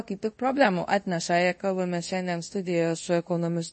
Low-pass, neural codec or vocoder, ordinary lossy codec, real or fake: 10.8 kHz; codec, 24 kHz, 0.5 kbps, DualCodec; MP3, 32 kbps; fake